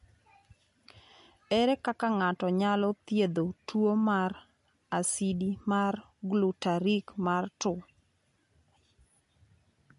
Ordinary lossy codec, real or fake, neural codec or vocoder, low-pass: MP3, 48 kbps; real; none; 10.8 kHz